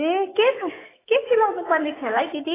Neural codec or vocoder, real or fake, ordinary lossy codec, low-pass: autoencoder, 48 kHz, 128 numbers a frame, DAC-VAE, trained on Japanese speech; fake; AAC, 16 kbps; 3.6 kHz